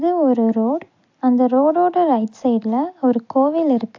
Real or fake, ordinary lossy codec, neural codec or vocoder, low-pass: real; AAC, 48 kbps; none; 7.2 kHz